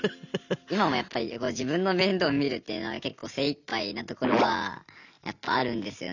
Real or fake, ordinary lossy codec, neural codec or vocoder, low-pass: real; none; none; 7.2 kHz